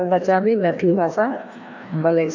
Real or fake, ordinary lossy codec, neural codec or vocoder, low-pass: fake; AAC, 48 kbps; codec, 16 kHz, 1 kbps, FreqCodec, larger model; 7.2 kHz